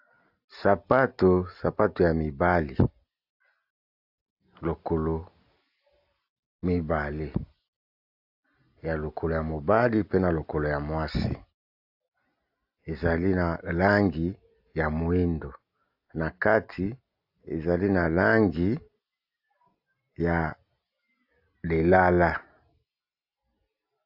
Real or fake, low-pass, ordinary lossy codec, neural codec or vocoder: real; 5.4 kHz; AAC, 48 kbps; none